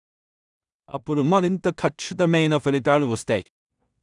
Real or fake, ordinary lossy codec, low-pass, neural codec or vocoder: fake; none; 10.8 kHz; codec, 16 kHz in and 24 kHz out, 0.4 kbps, LongCat-Audio-Codec, two codebook decoder